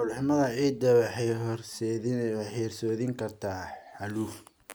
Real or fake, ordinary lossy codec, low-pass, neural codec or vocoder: real; none; none; none